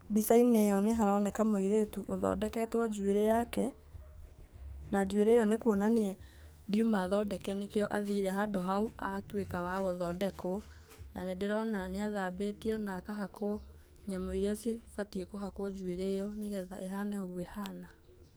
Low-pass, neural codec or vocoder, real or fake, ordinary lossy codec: none; codec, 44.1 kHz, 2.6 kbps, SNAC; fake; none